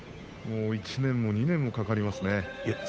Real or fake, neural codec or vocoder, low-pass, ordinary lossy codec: fake; codec, 16 kHz, 8 kbps, FunCodec, trained on Chinese and English, 25 frames a second; none; none